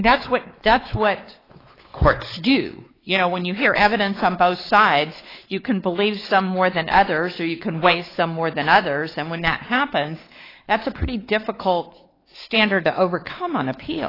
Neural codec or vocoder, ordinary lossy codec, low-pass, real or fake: codec, 16 kHz, 4 kbps, X-Codec, WavLM features, trained on Multilingual LibriSpeech; AAC, 24 kbps; 5.4 kHz; fake